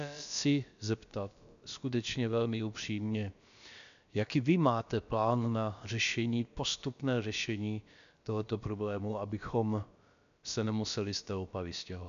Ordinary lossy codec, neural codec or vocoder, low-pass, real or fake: AAC, 96 kbps; codec, 16 kHz, about 1 kbps, DyCAST, with the encoder's durations; 7.2 kHz; fake